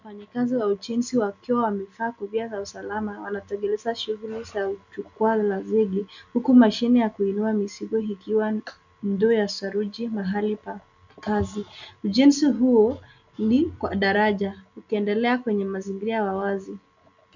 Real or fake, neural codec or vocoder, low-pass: real; none; 7.2 kHz